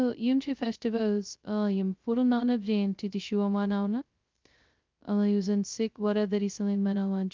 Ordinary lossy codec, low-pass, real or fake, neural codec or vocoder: Opus, 24 kbps; 7.2 kHz; fake; codec, 16 kHz, 0.2 kbps, FocalCodec